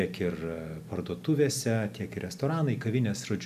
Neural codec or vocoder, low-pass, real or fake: none; 14.4 kHz; real